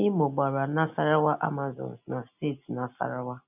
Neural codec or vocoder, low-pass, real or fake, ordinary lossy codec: none; 3.6 kHz; real; none